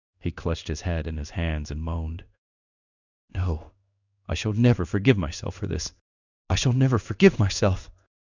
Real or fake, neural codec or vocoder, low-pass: fake; codec, 16 kHz in and 24 kHz out, 1 kbps, XY-Tokenizer; 7.2 kHz